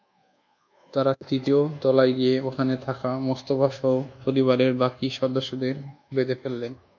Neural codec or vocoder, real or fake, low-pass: codec, 24 kHz, 1.2 kbps, DualCodec; fake; 7.2 kHz